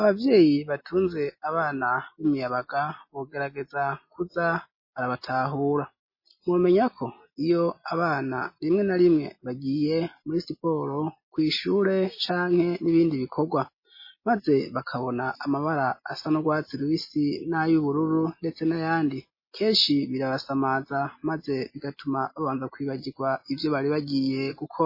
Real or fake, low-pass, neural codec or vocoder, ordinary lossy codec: real; 5.4 kHz; none; MP3, 24 kbps